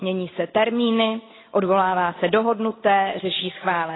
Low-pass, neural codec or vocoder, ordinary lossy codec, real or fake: 7.2 kHz; none; AAC, 16 kbps; real